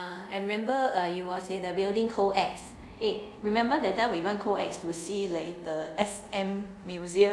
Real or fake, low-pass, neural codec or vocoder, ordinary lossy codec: fake; none; codec, 24 kHz, 0.5 kbps, DualCodec; none